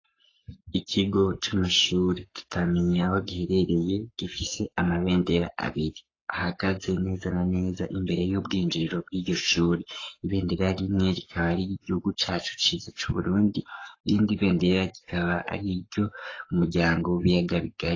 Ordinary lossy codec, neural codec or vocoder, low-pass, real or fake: AAC, 32 kbps; codec, 44.1 kHz, 7.8 kbps, Pupu-Codec; 7.2 kHz; fake